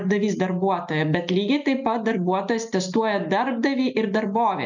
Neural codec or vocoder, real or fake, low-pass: none; real; 7.2 kHz